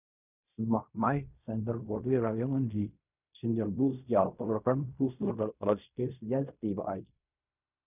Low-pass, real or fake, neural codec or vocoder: 3.6 kHz; fake; codec, 16 kHz in and 24 kHz out, 0.4 kbps, LongCat-Audio-Codec, fine tuned four codebook decoder